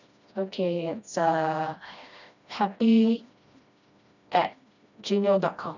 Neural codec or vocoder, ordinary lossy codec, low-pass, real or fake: codec, 16 kHz, 1 kbps, FreqCodec, smaller model; none; 7.2 kHz; fake